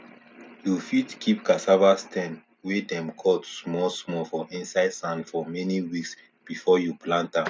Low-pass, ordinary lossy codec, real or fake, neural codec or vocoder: none; none; real; none